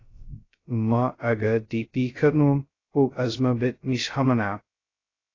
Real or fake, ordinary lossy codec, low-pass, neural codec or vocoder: fake; AAC, 32 kbps; 7.2 kHz; codec, 16 kHz, 0.2 kbps, FocalCodec